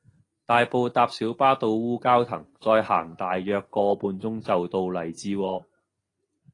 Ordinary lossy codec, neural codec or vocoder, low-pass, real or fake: AAC, 32 kbps; none; 10.8 kHz; real